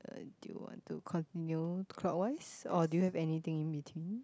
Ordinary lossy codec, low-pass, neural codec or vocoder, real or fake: none; none; none; real